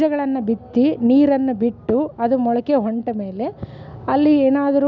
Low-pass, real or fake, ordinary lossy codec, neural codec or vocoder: 7.2 kHz; real; none; none